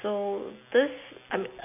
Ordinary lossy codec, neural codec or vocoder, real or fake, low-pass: none; none; real; 3.6 kHz